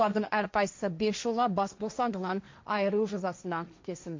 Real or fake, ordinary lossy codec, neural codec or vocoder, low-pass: fake; MP3, 48 kbps; codec, 16 kHz, 1.1 kbps, Voila-Tokenizer; 7.2 kHz